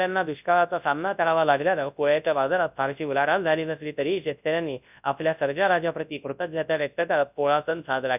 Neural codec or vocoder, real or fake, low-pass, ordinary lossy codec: codec, 24 kHz, 0.9 kbps, WavTokenizer, large speech release; fake; 3.6 kHz; none